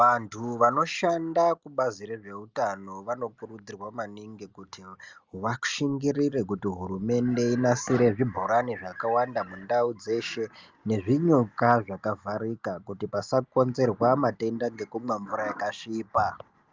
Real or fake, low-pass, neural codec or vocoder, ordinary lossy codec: real; 7.2 kHz; none; Opus, 32 kbps